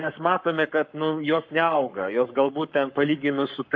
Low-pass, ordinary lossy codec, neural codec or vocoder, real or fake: 7.2 kHz; MP3, 48 kbps; codec, 44.1 kHz, 7.8 kbps, Pupu-Codec; fake